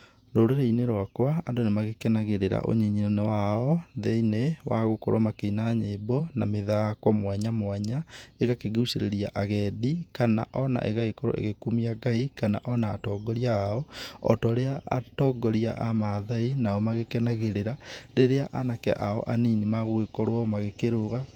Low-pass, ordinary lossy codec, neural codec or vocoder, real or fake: 19.8 kHz; none; vocoder, 48 kHz, 128 mel bands, Vocos; fake